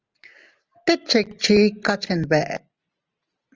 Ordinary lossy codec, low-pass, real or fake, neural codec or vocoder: Opus, 24 kbps; 7.2 kHz; real; none